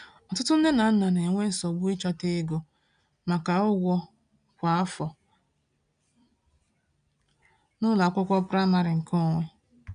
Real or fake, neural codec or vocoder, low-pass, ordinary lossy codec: real; none; 9.9 kHz; none